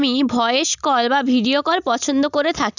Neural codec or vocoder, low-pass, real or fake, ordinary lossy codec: none; 7.2 kHz; real; none